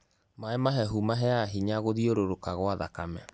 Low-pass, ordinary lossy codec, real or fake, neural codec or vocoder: none; none; real; none